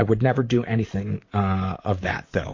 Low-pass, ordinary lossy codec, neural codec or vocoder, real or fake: 7.2 kHz; MP3, 48 kbps; vocoder, 44.1 kHz, 128 mel bands, Pupu-Vocoder; fake